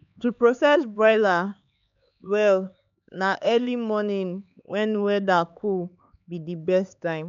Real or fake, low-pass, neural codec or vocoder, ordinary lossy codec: fake; 7.2 kHz; codec, 16 kHz, 4 kbps, X-Codec, HuBERT features, trained on LibriSpeech; none